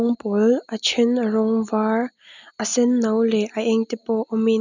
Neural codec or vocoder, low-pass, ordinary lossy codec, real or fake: none; 7.2 kHz; none; real